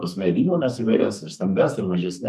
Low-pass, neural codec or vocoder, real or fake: 14.4 kHz; codec, 32 kHz, 1.9 kbps, SNAC; fake